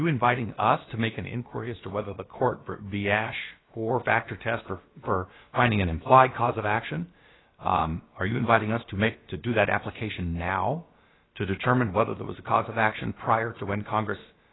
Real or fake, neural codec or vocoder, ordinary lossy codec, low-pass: fake; codec, 16 kHz, about 1 kbps, DyCAST, with the encoder's durations; AAC, 16 kbps; 7.2 kHz